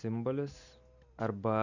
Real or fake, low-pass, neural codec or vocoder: real; 7.2 kHz; none